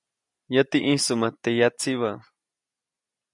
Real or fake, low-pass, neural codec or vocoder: real; 10.8 kHz; none